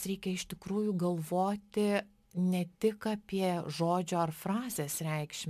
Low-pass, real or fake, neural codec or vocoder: 14.4 kHz; real; none